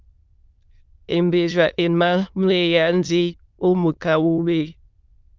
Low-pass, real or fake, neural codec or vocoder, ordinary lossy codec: 7.2 kHz; fake; autoencoder, 22.05 kHz, a latent of 192 numbers a frame, VITS, trained on many speakers; Opus, 24 kbps